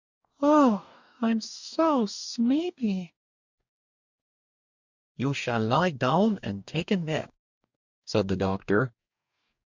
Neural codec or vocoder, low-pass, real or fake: codec, 44.1 kHz, 2.6 kbps, DAC; 7.2 kHz; fake